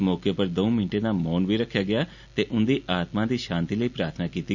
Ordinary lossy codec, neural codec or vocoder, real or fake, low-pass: none; none; real; 7.2 kHz